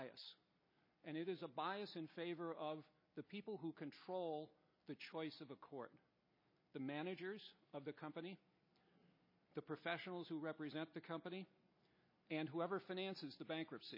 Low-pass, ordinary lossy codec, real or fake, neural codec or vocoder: 5.4 kHz; MP3, 24 kbps; real; none